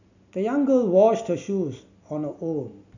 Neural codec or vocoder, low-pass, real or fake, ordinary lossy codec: none; 7.2 kHz; real; none